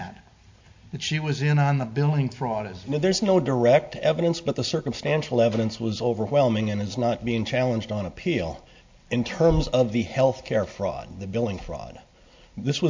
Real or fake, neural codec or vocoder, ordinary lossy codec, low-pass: real; none; MP3, 48 kbps; 7.2 kHz